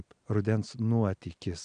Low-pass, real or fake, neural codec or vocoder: 9.9 kHz; real; none